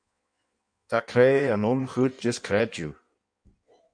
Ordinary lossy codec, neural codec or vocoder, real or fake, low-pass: MP3, 96 kbps; codec, 16 kHz in and 24 kHz out, 1.1 kbps, FireRedTTS-2 codec; fake; 9.9 kHz